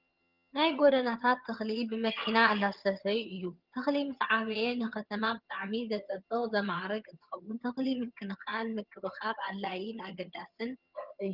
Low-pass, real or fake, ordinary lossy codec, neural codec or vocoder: 5.4 kHz; fake; Opus, 32 kbps; vocoder, 22.05 kHz, 80 mel bands, HiFi-GAN